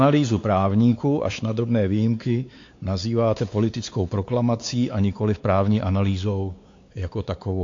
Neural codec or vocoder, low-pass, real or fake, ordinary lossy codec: codec, 16 kHz, 4 kbps, X-Codec, WavLM features, trained on Multilingual LibriSpeech; 7.2 kHz; fake; AAC, 48 kbps